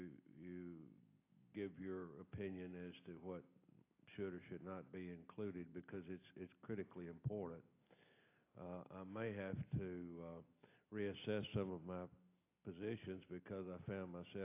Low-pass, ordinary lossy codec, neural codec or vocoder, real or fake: 3.6 kHz; AAC, 24 kbps; none; real